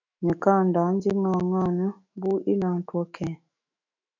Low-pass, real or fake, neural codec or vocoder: 7.2 kHz; fake; autoencoder, 48 kHz, 128 numbers a frame, DAC-VAE, trained on Japanese speech